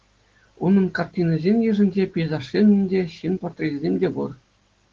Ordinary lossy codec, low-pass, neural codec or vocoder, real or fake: Opus, 16 kbps; 7.2 kHz; none; real